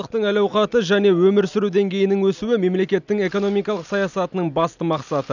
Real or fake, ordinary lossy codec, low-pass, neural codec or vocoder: real; none; 7.2 kHz; none